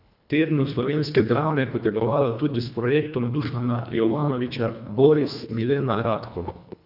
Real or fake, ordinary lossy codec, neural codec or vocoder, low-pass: fake; none; codec, 24 kHz, 1.5 kbps, HILCodec; 5.4 kHz